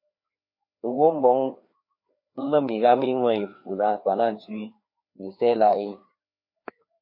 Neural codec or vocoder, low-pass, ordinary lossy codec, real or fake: codec, 16 kHz, 2 kbps, FreqCodec, larger model; 5.4 kHz; MP3, 32 kbps; fake